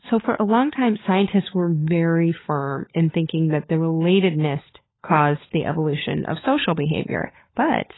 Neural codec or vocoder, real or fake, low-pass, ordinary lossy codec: codec, 16 kHz, 16 kbps, FunCodec, trained on LibriTTS, 50 frames a second; fake; 7.2 kHz; AAC, 16 kbps